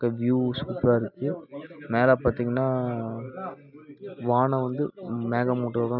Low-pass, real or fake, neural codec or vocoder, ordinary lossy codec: 5.4 kHz; real; none; none